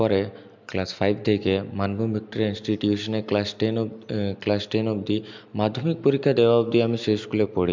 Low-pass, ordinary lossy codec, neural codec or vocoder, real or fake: 7.2 kHz; MP3, 64 kbps; none; real